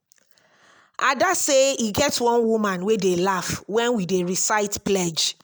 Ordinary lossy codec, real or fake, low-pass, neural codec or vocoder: none; real; none; none